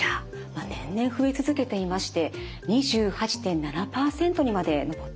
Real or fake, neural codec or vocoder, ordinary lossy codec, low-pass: real; none; none; none